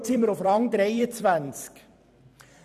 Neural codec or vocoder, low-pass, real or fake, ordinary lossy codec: vocoder, 44.1 kHz, 128 mel bands every 256 samples, BigVGAN v2; 14.4 kHz; fake; none